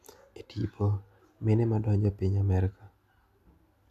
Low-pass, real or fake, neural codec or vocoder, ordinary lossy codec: 14.4 kHz; real; none; none